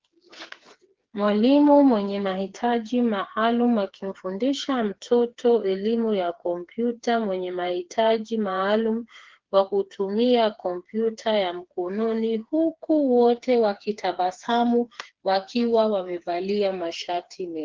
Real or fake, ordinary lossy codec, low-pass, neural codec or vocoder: fake; Opus, 16 kbps; 7.2 kHz; codec, 16 kHz, 4 kbps, FreqCodec, smaller model